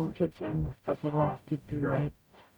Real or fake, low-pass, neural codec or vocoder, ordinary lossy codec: fake; none; codec, 44.1 kHz, 0.9 kbps, DAC; none